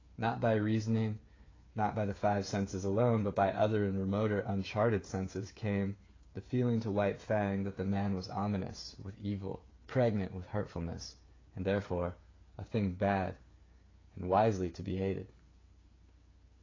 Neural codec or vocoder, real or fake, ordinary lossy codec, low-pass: codec, 16 kHz, 8 kbps, FreqCodec, smaller model; fake; AAC, 32 kbps; 7.2 kHz